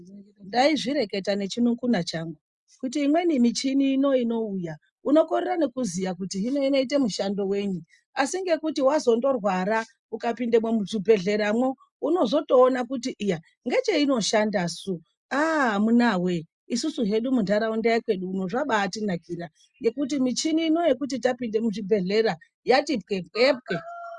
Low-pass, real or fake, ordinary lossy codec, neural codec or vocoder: 10.8 kHz; real; Opus, 64 kbps; none